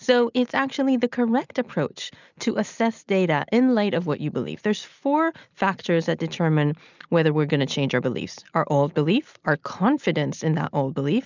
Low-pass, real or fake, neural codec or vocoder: 7.2 kHz; real; none